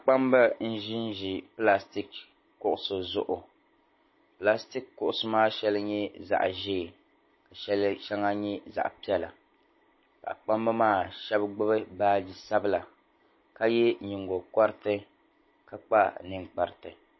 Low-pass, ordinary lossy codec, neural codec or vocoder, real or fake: 7.2 kHz; MP3, 24 kbps; none; real